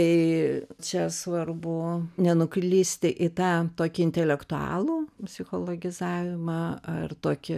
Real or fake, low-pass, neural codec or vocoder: real; 14.4 kHz; none